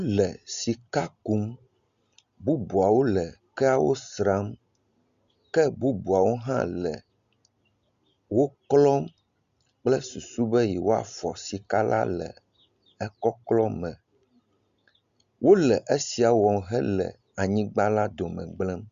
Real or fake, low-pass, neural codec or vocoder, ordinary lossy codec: real; 7.2 kHz; none; Opus, 64 kbps